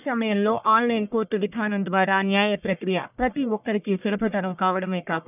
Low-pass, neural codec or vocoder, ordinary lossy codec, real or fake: 3.6 kHz; codec, 44.1 kHz, 1.7 kbps, Pupu-Codec; none; fake